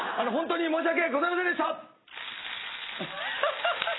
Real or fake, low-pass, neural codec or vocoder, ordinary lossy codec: real; 7.2 kHz; none; AAC, 16 kbps